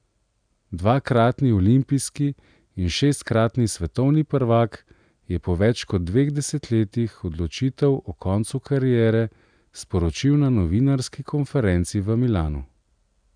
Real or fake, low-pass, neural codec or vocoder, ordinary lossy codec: real; 9.9 kHz; none; none